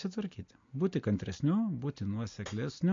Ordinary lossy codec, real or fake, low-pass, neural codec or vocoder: MP3, 48 kbps; real; 7.2 kHz; none